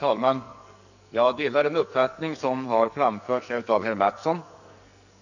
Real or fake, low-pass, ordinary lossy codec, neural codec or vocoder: fake; 7.2 kHz; none; codec, 44.1 kHz, 2.6 kbps, SNAC